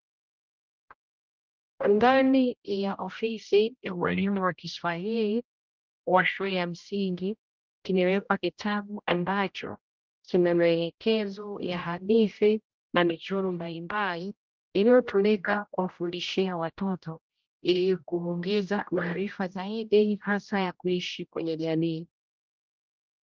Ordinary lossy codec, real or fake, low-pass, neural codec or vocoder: Opus, 24 kbps; fake; 7.2 kHz; codec, 16 kHz, 0.5 kbps, X-Codec, HuBERT features, trained on general audio